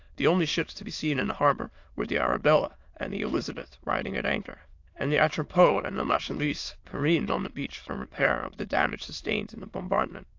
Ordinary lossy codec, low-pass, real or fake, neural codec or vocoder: AAC, 48 kbps; 7.2 kHz; fake; autoencoder, 22.05 kHz, a latent of 192 numbers a frame, VITS, trained on many speakers